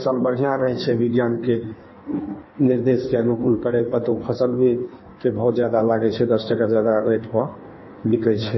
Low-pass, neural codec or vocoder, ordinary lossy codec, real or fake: 7.2 kHz; codec, 16 kHz in and 24 kHz out, 1.1 kbps, FireRedTTS-2 codec; MP3, 24 kbps; fake